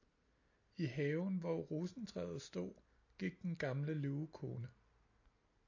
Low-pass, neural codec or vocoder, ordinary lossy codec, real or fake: 7.2 kHz; none; AAC, 48 kbps; real